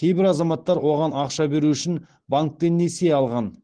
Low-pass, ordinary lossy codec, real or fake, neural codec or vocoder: 9.9 kHz; Opus, 16 kbps; real; none